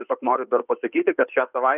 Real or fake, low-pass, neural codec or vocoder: fake; 3.6 kHz; codec, 16 kHz in and 24 kHz out, 2.2 kbps, FireRedTTS-2 codec